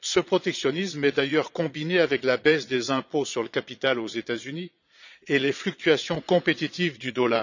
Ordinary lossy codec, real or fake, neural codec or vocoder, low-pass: none; fake; vocoder, 22.05 kHz, 80 mel bands, Vocos; 7.2 kHz